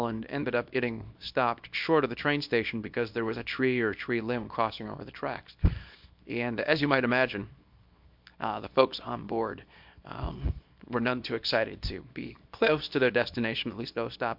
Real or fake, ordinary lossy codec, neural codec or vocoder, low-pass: fake; MP3, 48 kbps; codec, 24 kHz, 0.9 kbps, WavTokenizer, small release; 5.4 kHz